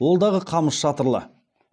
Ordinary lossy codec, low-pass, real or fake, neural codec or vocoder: none; 9.9 kHz; real; none